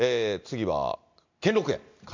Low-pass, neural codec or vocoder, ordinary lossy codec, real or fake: 7.2 kHz; none; MP3, 64 kbps; real